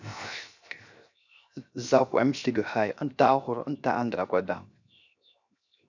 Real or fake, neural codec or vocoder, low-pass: fake; codec, 16 kHz, 0.7 kbps, FocalCodec; 7.2 kHz